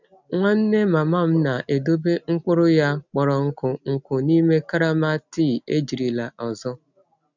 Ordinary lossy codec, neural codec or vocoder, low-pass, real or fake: none; none; 7.2 kHz; real